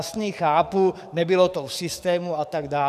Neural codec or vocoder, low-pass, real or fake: codec, 44.1 kHz, 7.8 kbps, DAC; 14.4 kHz; fake